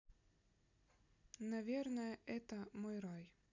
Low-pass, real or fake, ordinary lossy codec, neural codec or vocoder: 7.2 kHz; real; none; none